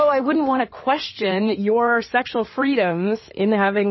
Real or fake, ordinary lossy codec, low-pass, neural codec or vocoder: fake; MP3, 24 kbps; 7.2 kHz; codec, 16 kHz in and 24 kHz out, 2.2 kbps, FireRedTTS-2 codec